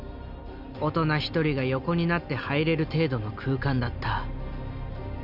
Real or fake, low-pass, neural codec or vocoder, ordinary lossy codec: real; 5.4 kHz; none; none